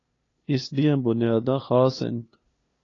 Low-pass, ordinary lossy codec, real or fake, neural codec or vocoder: 7.2 kHz; AAC, 32 kbps; fake; codec, 16 kHz, 2 kbps, FunCodec, trained on LibriTTS, 25 frames a second